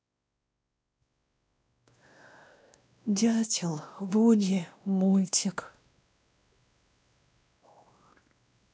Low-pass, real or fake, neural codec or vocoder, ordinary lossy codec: none; fake; codec, 16 kHz, 1 kbps, X-Codec, WavLM features, trained on Multilingual LibriSpeech; none